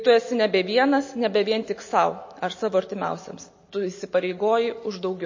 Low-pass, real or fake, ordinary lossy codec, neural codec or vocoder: 7.2 kHz; real; MP3, 32 kbps; none